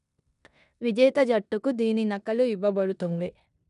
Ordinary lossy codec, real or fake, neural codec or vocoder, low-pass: none; fake; codec, 16 kHz in and 24 kHz out, 0.9 kbps, LongCat-Audio-Codec, four codebook decoder; 10.8 kHz